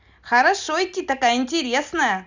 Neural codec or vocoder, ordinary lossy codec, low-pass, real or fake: none; Opus, 64 kbps; 7.2 kHz; real